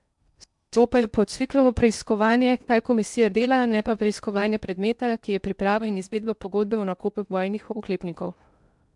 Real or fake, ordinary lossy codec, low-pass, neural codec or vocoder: fake; MP3, 96 kbps; 10.8 kHz; codec, 16 kHz in and 24 kHz out, 0.8 kbps, FocalCodec, streaming, 65536 codes